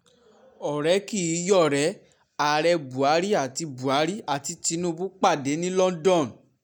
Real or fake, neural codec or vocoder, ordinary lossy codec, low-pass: real; none; none; 19.8 kHz